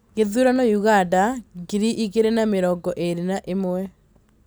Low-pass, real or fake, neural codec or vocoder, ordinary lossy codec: none; real; none; none